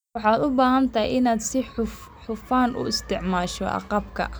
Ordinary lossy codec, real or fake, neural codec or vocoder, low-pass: none; real; none; none